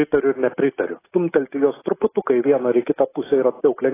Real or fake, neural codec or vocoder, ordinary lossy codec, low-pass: real; none; AAC, 16 kbps; 3.6 kHz